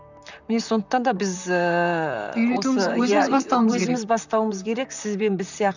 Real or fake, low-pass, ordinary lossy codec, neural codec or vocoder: fake; 7.2 kHz; none; vocoder, 44.1 kHz, 128 mel bands, Pupu-Vocoder